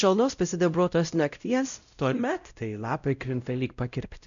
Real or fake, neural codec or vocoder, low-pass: fake; codec, 16 kHz, 0.5 kbps, X-Codec, WavLM features, trained on Multilingual LibriSpeech; 7.2 kHz